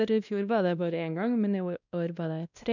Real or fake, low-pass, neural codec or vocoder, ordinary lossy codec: fake; 7.2 kHz; codec, 16 kHz, 1 kbps, X-Codec, WavLM features, trained on Multilingual LibriSpeech; none